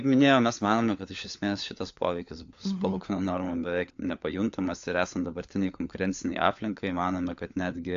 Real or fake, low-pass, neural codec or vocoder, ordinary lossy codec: fake; 7.2 kHz; codec, 16 kHz, 4 kbps, FunCodec, trained on LibriTTS, 50 frames a second; AAC, 64 kbps